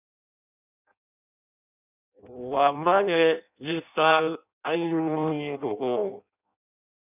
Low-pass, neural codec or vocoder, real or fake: 3.6 kHz; codec, 16 kHz in and 24 kHz out, 0.6 kbps, FireRedTTS-2 codec; fake